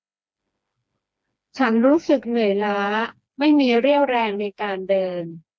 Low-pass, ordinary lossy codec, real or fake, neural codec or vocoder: none; none; fake; codec, 16 kHz, 2 kbps, FreqCodec, smaller model